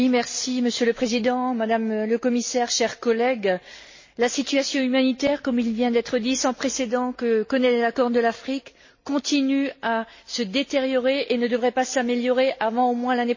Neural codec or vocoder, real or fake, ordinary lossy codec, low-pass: none; real; MP3, 32 kbps; 7.2 kHz